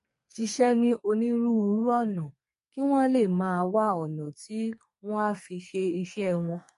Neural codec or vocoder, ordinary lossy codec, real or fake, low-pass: codec, 44.1 kHz, 2.6 kbps, SNAC; MP3, 48 kbps; fake; 14.4 kHz